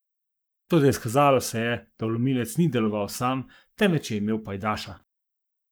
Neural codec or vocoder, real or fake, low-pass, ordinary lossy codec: codec, 44.1 kHz, 7.8 kbps, Pupu-Codec; fake; none; none